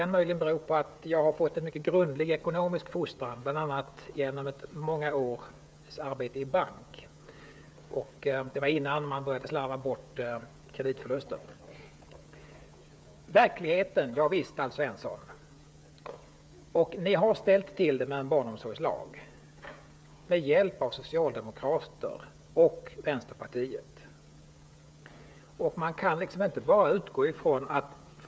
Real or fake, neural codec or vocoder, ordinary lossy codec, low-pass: fake; codec, 16 kHz, 16 kbps, FreqCodec, smaller model; none; none